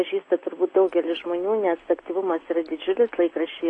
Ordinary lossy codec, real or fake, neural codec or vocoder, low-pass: AAC, 32 kbps; real; none; 9.9 kHz